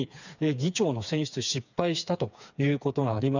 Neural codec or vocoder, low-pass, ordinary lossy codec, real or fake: codec, 16 kHz, 4 kbps, FreqCodec, smaller model; 7.2 kHz; none; fake